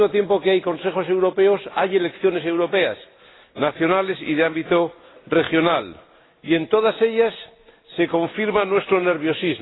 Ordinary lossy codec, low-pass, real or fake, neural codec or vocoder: AAC, 16 kbps; 7.2 kHz; real; none